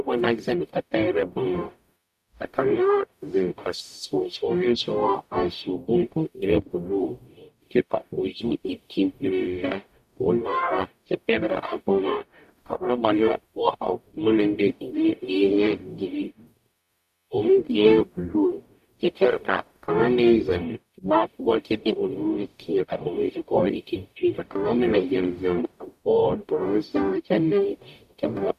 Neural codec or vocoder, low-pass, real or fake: codec, 44.1 kHz, 0.9 kbps, DAC; 14.4 kHz; fake